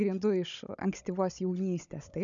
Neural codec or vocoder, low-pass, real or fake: codec, 16 kHz, 16 kbps, FunCodec, trained on Chinese and English, 50 frames a second; 7.2 kHz; fake